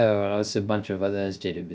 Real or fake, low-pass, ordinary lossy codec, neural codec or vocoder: fake; none; none; codec, 16 kHz, 0.3 kbps, FocalCodec